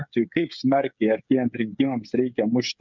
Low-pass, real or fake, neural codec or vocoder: 7.2 kHz; fake; codec, 16 kHz, 8 kbps, FreqCodec, smaller model